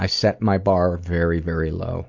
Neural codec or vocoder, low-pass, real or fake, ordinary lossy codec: none; 7.2 kHz; real; MP3, 64 kbps